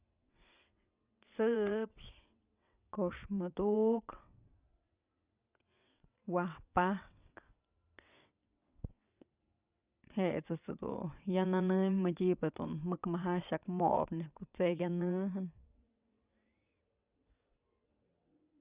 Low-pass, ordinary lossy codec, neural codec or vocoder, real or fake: 3.6 kHz; Opus, 64 kbps; vocoder, 22.05 kHz, 80 mel bands, WaveNeXt; fake